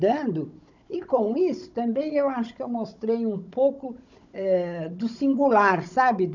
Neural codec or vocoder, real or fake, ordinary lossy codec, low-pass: codec, 16 kHz, 16 kbps, FunCodec, trained on Chinese and English, 50 frames a second; fake; none; 7.2 kHz